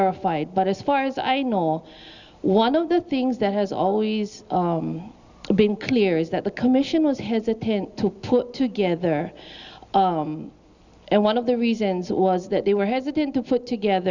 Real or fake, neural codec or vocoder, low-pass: real; none; 7.2 kHz